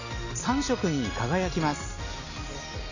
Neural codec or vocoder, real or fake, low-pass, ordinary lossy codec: none; real; 7.2 kHz; none